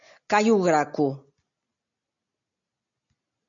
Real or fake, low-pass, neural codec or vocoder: real; 7.2 kHz; none